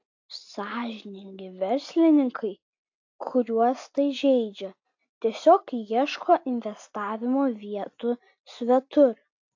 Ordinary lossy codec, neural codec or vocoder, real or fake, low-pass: AAC, 48 kbps; none; real; 7.2 kHz